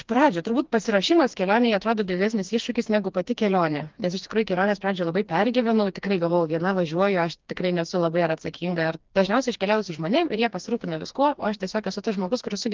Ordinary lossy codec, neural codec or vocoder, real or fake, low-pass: Opus, 32 kbps; codec, 16 kHz, 2 kbps, FreqCodec, smaller model; fake; 7.2 kHz